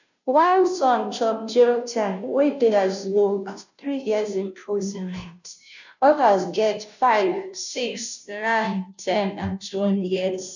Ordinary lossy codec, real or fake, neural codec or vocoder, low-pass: none; fake; codec, 16 kHz, 0.5 kbps, FunCodec, trained on Chinese and English, 25 frames a second; 7.2 kHz